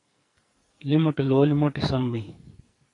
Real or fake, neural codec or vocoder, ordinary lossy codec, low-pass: fake; codec, 44.1 kHz, 2.6 kbps, SNAC; AAC, 48 kbps; 10.8 kHz